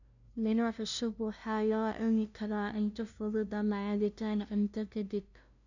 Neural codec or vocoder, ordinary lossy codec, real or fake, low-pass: codec, 16 kHz, 0.5 kbps, FunCodec, trained on LibriTTS, 25 frames a second; none; fake; 7.2 kHz